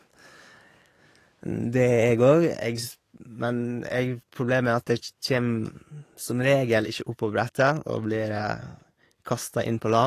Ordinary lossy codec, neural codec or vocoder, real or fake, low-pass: AAC, 48 kbps; codec, 44.1 kHz, 7.8 kbps, DAC; fake; 14.4 kHz